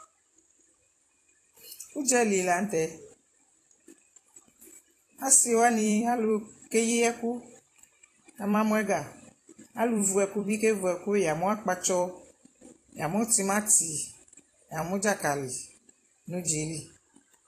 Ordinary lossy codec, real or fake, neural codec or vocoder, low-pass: AAC, 48 kbps; fake; vocoder, 44.1 kHz, 128 mel bands every 256 samples, BigVGAN v2; 14.4 kHz